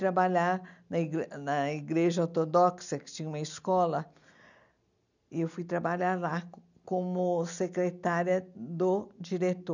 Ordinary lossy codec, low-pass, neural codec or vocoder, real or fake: none; 7.2 kHz; none; real